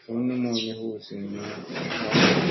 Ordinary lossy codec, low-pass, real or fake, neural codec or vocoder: MP3, 24 kbps; 7.2 kHz; real; none